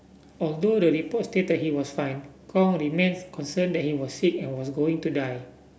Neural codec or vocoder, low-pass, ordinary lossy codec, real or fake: none; none; none; real